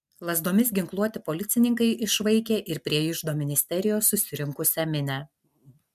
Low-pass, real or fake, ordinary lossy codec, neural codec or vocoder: 14.4 kHz; real; MP3, 96 kbps; none